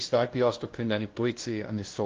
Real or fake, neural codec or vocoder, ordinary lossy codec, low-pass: fake; codec, 16 kHz, 0.5 kbps, FunCodec, trained on LibriTTS, 25 frames a second; Opus, 16 kbps; 7.2 kHz